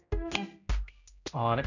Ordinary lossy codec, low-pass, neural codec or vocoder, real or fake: none; 7.2 kHz; codec, 16 kHz, 0.5 kbps, X-Codec, HuBERT features, trained on general audio; fake